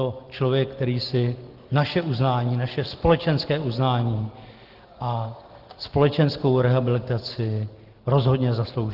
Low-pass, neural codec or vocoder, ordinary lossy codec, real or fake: 5.4 kHz; none; Opus, 16 kbps; real